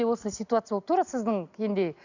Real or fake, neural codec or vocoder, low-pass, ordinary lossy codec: real; none; 7.2 kHz; none